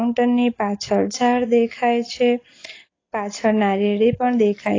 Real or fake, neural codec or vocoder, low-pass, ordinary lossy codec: real; none; 7.2 kHz; AAC, 32 kbps